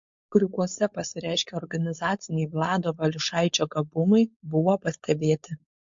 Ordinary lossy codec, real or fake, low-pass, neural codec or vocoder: MP3, 48 kbps; fake; 7.2 kHz; codec, 16 kHz, 4.8 kbps, FACodec